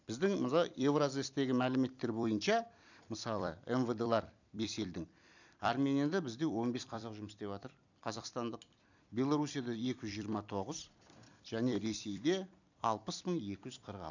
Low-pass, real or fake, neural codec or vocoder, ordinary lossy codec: 7.2 kHz; fake; vocoder, 44.1 kHz, 128 mel bands every 256 samples, BigVGAN v2; none